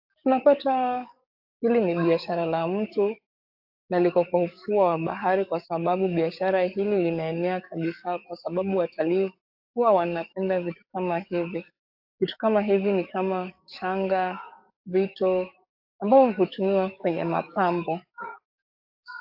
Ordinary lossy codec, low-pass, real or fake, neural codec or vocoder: AAC, 48 kbps; 5.4 kHz; fake; codec, 44.1 kHz, 7.8 kbps, DAC